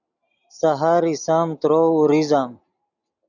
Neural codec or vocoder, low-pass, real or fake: none; 7.2 kHz; real